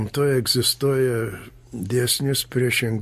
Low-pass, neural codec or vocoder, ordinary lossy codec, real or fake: 14.4 kHz; none; MP3, 64 kbps; real